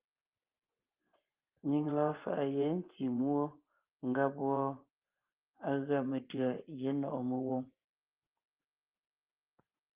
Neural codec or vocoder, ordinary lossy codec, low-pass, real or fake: vocoder, 24 kHz, 100 mel bands, Vocos; Opus, 32 kbps; 3.6 kHz; fake